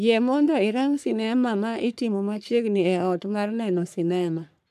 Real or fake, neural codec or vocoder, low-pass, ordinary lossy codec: fake; codec, 44.1 kHz, 3.4 kbps, Pupu-Codec; 14.4 kHz; none